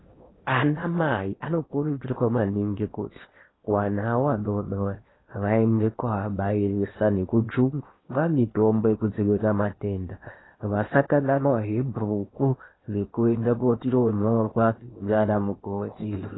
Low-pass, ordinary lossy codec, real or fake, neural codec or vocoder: 7.2 kHz; AAC, 16 kbps; fake; codec, 16 kHz in and 24 kHz out, 0.8 kbps, FocalCodec, streaming, 65536 codes